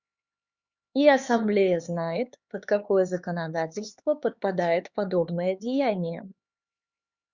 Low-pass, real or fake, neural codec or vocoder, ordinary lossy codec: 7.2 kHz; fake; codec, 16 kHz, 4 kbps, X-Codec, HuBERT features, trained on LibriSpeech; Opus, 64 kbps